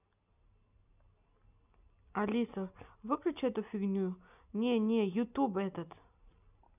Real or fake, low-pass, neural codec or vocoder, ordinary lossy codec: real; 3.6 kHz; none; none